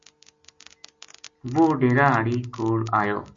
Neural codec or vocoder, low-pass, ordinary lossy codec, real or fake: none; 7.2 kHz; MP3, 48 kbps; real